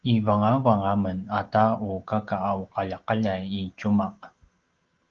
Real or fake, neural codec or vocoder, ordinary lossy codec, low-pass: real; none; Opus, 16 kbps; 7.2 kHz